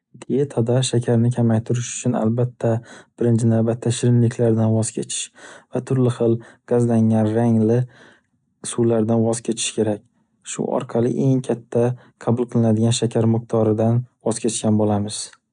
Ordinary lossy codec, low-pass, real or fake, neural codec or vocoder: none; 9.9 kHz; real; none